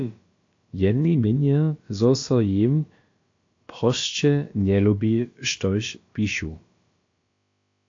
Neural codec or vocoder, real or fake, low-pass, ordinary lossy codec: codec, 16 kHz, about 1 kbps, DyCAST, with the encoder's durations; fake; 7.2 kHz; AAC, 48 kbps